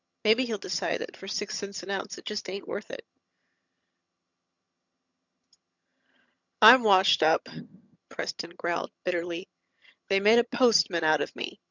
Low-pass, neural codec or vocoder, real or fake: 7.2 kHz; vocoder, 22.05 kHz, 80 mel bands, HiFi-GAN; fake